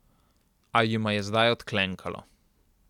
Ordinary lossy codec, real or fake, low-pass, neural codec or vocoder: none; real; 19.8 kHz; none